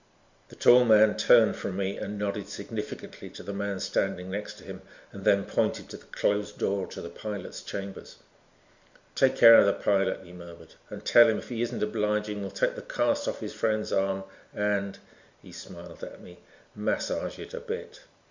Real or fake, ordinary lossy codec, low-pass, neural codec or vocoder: real; Opus, 64 kbps; 7.2 kHz; none